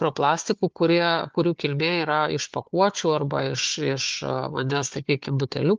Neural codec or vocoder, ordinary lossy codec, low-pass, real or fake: codec, 16 kHz, 4 kbps, FunCodec, trained on Chinese and English, 50 frames a second; Opus, 24 kbps; 7.2 kHz; fake